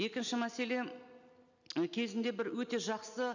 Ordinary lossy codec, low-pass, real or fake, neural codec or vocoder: none; 7.2 kHz; real; none